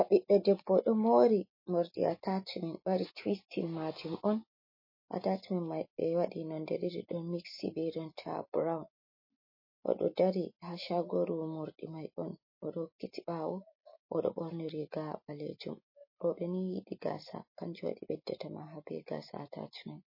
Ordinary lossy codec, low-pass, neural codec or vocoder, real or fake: MP3, 24 kbps; 5.4 kHz; none; real